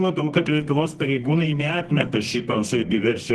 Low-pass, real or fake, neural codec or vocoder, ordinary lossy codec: 10.8 kHz; fake; codec, 24 kHz, 0.9 kbps, WavTokenizer, medium music audio release; Opus, 16 kbps